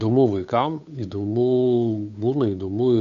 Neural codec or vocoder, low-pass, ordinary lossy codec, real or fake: codec, 16 kHz, 8 kbps, FunCodec, trained on Chinese and English, 25 frames a second; 7.2 kHz; MP3, 64 kbps; fake